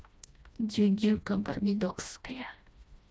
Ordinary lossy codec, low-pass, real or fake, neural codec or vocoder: none; none; fake; codec, 16 kHz, 1 kbps, FreqCodec, smaller model